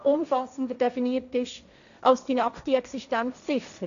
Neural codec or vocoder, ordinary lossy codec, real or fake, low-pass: codec, 16 kHz, 1.1 kbps, Voila-Tokenizer; none; fake; 7.2 kHz